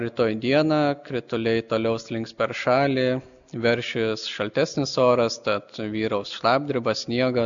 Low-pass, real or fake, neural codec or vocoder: 7.2 kHz; real; none